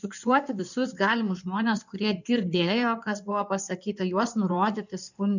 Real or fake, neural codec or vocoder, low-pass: fake; codec, 16 kHz in and 24 kHz out, 2.2 kbps, FireRedTTS-2 codec; 7.2 kHz